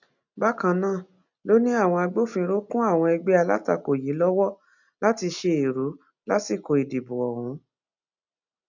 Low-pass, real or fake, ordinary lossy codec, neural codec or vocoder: 7.2 kHz; real; none; none